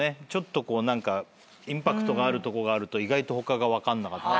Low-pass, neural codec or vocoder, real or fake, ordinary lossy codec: none; none; real; none